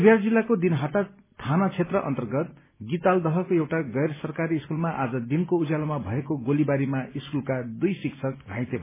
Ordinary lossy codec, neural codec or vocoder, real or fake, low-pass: MP3, 16 kbps; none; real; 3.6 kHz